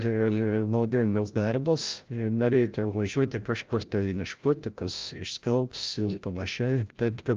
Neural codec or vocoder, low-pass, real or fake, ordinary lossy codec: codec, 16 kHz, 0.5 kbps, FreqCodec, larger model; 7.2 kHz; fake; Opus, 24 kbps